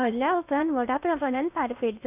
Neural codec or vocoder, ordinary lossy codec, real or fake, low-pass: codec, 16 kHz in and 24 kHz out, 0.8 kbps, FocalCodec, streaming, 65536 codes; none; fake; 3.6 kHz